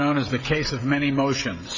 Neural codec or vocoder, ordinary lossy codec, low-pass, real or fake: codec, 16 kHz, 16 kbps, FreqCodec, smaller model; MP3, 64 kbps; 7.2 kHz; fake